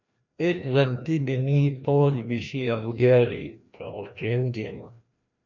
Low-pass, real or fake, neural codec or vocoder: 7.2 kHz; fake; codec, 16 kHz, 1 kbps, FreqCodec, larger model